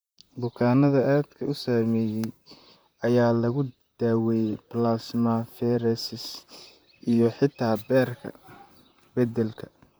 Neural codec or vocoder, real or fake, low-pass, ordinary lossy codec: vocoder, 44.1 kHz, 128 mel bands, Pupu-Vocoder; fake; none; none